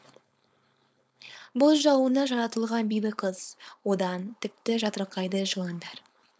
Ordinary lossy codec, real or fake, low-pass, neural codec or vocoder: none; fake; none; codec, 16 kHz, 4.8 kbps, FACodec